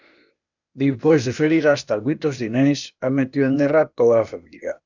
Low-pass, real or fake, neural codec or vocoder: 7.2 kHz; fake; codec, 16 kHz, 0.8 kbps, ZipCodec